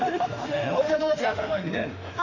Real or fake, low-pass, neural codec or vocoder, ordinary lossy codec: fake; 7.2 kHz; autoencoder, 48 kHz, 32 numbers a frame, DAC-VAE, trained on Japanese speech; none